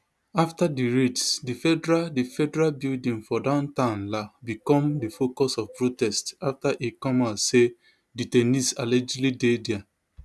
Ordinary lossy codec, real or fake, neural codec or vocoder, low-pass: none; real; none; none